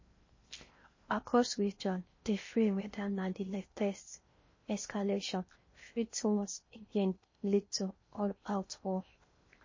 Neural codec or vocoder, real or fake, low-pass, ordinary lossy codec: codec, 16 kHz in and 24 kHz out, 0.6 kbps, FocalCodec, streaming, 4096 codes; fake; 7.2 kHz; MP3, 32 kbps